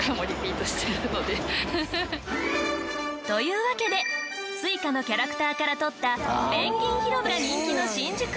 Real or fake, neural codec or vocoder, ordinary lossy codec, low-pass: real; none; none; none